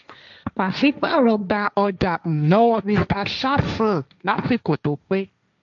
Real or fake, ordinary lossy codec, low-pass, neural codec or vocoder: fake; none; 7.2 kHz; codec, 16 kHz, 1.1 kbps, Voila-Tokenizer